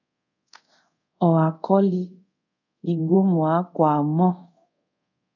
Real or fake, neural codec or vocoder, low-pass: fake; codec, 24 kHz, 0.5 kbps, DualCodec; 7.2 kHz